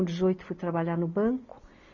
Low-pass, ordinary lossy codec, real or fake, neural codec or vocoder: 7.2 kHz; none; real; none